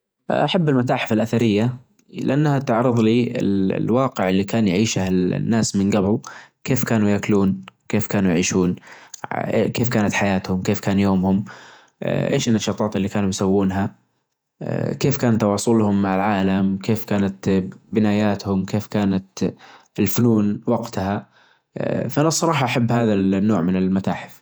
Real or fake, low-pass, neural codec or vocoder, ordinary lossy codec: fake; none; vocoder, 48 kHz, 128 mel bands, Vocos; none